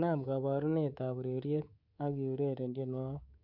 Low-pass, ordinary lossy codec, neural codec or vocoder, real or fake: 5.4 kHz; none; codec, 24 kHz, 3.1 kbps, DualCodec; fake